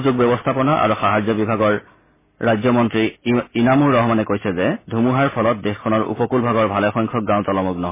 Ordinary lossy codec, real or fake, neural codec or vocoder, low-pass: MP3, 16 kbps; real; none; 3.6 kHz